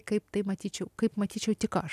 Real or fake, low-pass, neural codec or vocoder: real; 14.4 kHz; none